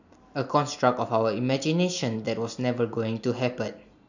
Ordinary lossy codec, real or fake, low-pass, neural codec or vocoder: none; real; 7.2 kHz; none